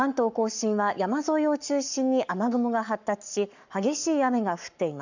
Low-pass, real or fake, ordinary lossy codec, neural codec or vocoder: 7.2 kHz; fake; none; codec, 44.1 kHz, 7.8 kbps, Pupu-Codec